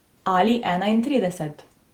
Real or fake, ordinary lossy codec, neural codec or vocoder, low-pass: fake; Opus, 24 kbps; vocoder, 48 kHz, 128 mel bands, Vocos; 19.8 kHz